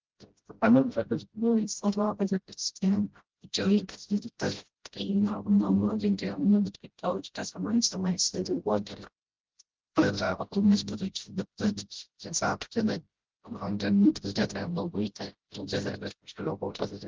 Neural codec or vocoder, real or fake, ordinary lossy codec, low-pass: codec, 16 kHz, 0.5 kbps, FreqCodec, smaller model; fake; Opus, 16 kbps; 7.2 kHz